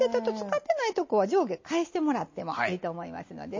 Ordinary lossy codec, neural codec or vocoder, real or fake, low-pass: MP3, 32 kbps; none; real; 7.2 kHz